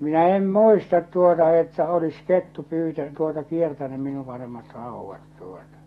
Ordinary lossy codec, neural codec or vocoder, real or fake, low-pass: AAC, 32 kbps; autoencoder, 48 kHz, 128 numbers a frame, DAC-VAE, trained on Japanese speech; fake; 19.8 kHz